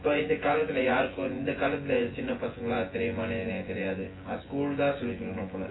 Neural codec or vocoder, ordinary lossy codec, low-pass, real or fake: vocoder, 24 kHz, 100 mel bands, Vocos; AAC, 16 kbps; 7.2 kHz; fake